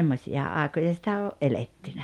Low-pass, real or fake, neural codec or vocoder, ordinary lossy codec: 19.8 kHz; real; none; Opus, 32 kbps